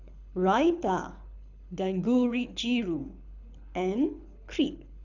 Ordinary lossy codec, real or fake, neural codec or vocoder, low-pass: none; fake; codec, 24 kHz, 6 kbps, HILCodec; 7.2 kHz